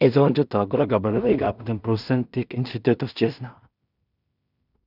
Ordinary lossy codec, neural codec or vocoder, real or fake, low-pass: none; codec, 16 kHz in and 24 kHz out, 0.4 kbps, LongCat-Audio-Codec, two codebook decoder; fake; 5.4 kHz